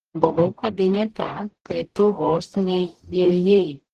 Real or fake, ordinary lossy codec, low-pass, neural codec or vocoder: fake; Opus, 16 kbps; 14.4 kHz; codec, 44.1 kHz, 0.9 kbps, DAC